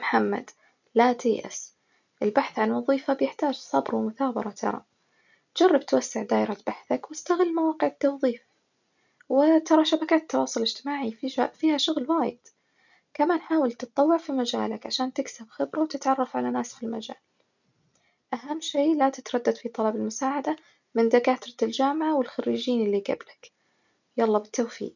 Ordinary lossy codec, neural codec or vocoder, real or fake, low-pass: none; none; real; 7.2 kHz